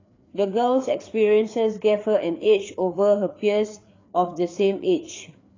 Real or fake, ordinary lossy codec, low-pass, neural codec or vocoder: fake; AAC, 32 kbps; 7.2 kHz; codec, 16 kHz, 4 kbps, FreqCodec, larger model